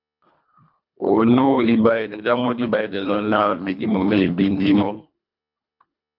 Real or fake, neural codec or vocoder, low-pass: fake; codec, 24 kHz, 1.5 kbps, HILCodec; 5.4 kHz